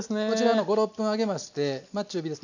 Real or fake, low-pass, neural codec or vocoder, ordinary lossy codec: real; 7.2 kHz; none; none